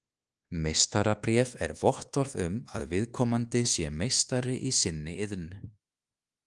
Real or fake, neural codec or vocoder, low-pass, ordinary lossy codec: fake; codec, 24 kHz, 1.2 kbps, DualCodec; 10.8 kHz; Opus, 24 kbps